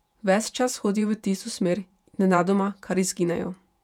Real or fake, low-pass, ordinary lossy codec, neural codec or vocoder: fake; 19.8 kHz; none; vocoder, 48 kHz, 128 mel bands, Vocos